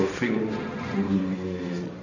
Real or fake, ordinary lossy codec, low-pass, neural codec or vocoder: fake; none; 7.2 kHz; codec, 16 kHz, 1.1 kbps, Voila-Tokenizer